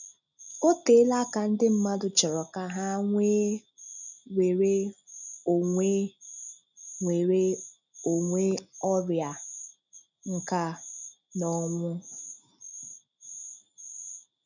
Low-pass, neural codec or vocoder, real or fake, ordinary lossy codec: 7.2 kHz; none; real; none